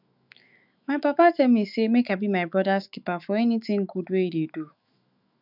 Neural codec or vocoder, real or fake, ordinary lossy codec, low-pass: autoencoder, 48 kHz, 128 numbers a frame, DAC-VAE, trained on Japanese speech; fake; none; 5.4 kHz